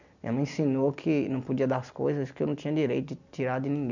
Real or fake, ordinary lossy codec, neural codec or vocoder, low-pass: real; none; none; 7.2 kHz